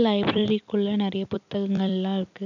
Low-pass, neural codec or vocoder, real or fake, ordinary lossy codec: 7.2 kHz; vocoder, 44.1 kHz, 128 mel bands every 256 samples, BigVGAN v2; fake; none